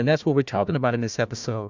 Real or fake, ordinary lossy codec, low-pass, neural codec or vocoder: fake; MP3, 64 kbps; 7.2 kHz; codec, 16 kHz, 1 kbps, FunCodec, trained on Chinese and English, 50 frames a second